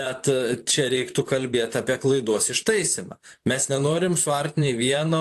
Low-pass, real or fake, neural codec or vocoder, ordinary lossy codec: 14.4 kHz; fake; vocoder, 44.1 kHz, 128 mel bands, Pupu-Vocoder; AAC, 64 kbps